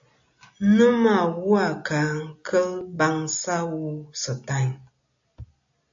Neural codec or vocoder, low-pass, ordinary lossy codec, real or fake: none; 7.2 kHz; AAC, 64 kbps; real